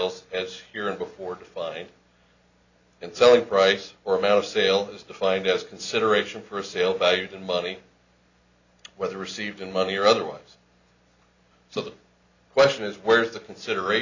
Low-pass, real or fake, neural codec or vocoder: 7.2 kHz; real; none